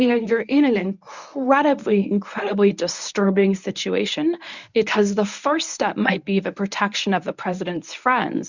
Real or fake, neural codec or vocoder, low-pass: fake; codec, 24 kHz, 0.9 kbps, WavTokenizer, medium speech release version 1; 7.2 kHz